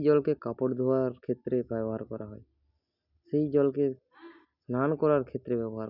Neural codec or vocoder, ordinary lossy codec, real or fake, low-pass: none; none; real; 5.4 kHz